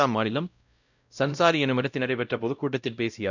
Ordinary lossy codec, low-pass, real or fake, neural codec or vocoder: none; 7.2 kHz; fake; codec, 16 kHz, 0.5 kbps, X-Codec, WavLM features, trained on Multilingual LibriSpeech